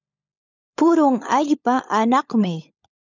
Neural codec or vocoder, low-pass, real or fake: codec, 16 kHz, 16 kbps, FunCodec, trained on LibriTTS, 50 frames a second; 7.2 kHz; fake